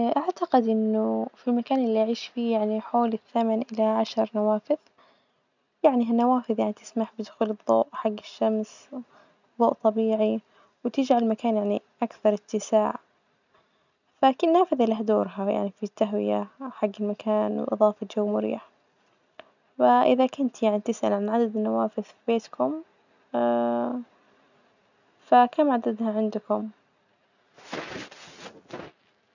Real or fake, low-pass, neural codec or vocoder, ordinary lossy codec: real; 7.2 kHz; none; none